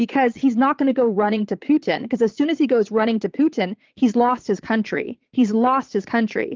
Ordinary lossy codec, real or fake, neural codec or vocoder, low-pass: Opus, 32 kbps; fake; vocoder, 22.05 kHz, 80 mel bands, Vocos; 7.2 kHz